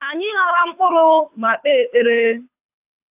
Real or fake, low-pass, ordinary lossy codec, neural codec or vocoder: fake; 3.6 kHz; AAC, 32 kbps; codec, 24 kHz, 6 kbps, HILCodec